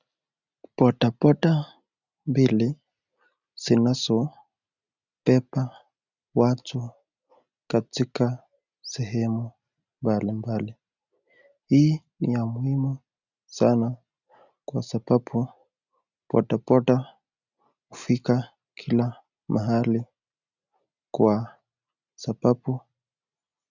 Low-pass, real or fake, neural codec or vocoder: 7.2 kHz; real; none